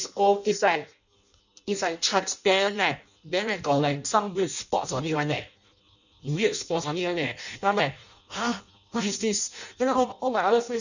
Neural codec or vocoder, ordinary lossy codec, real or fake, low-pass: codec, 16 kHz in and 24 kHz out, 0.6 kbps, FireRedTTS-2 codec; none; fake; 7.2 kHz